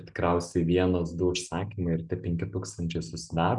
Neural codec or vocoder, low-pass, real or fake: none; 10.8 kHz; real